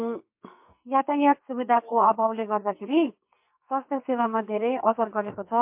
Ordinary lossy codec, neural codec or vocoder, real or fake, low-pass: MP3, 32 kbps; codec, 44.1 kHz, 2.6 kbps, SNAC; fake; 3.6 kHz